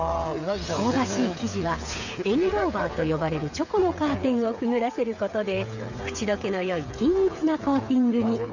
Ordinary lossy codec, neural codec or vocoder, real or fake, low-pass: none; codec, 16 kHz, 8 kbps, FreqCodec, smaller model; fake; 7.2 kHz